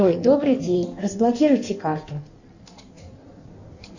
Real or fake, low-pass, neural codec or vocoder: fake; 7.2 kHz; codec, 16 kHz in and 24 kHz out, 1.1 kbps, FireRedTTS-2 codec